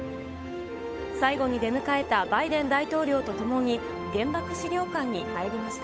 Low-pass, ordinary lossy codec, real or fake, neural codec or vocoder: none; none; fake; codec, 16 kHz, 8 kbps, FunCodec, trained on Chinese and English, 25 frames a second